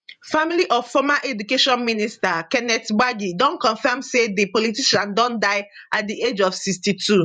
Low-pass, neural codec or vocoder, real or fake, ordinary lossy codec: 9.9 kHz; vocoder, 48 kHz, 128 mel bands, Vocos; fake; none